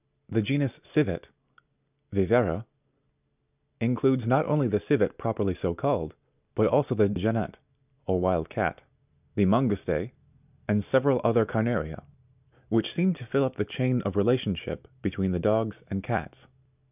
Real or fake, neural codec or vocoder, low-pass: real; none; 3.6 kHz